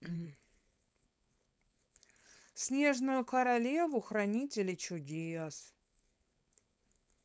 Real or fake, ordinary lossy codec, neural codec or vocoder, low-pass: fake; none; codec, 16 kHz, 4.8 kbps, FACodec; none